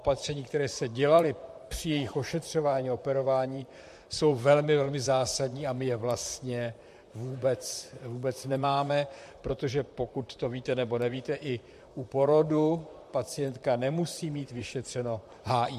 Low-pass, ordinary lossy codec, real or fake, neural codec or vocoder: 14.4 kHz; MP3, 64 kbps; fake; vocoder, 44.1 kHz, 128 mel bands, Pupu-Vocoder